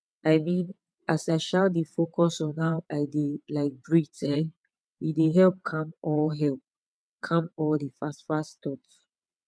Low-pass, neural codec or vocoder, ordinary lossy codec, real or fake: none; vocoder, 22.05 kHz, 80 mel bands, WaveNeXt; none; fake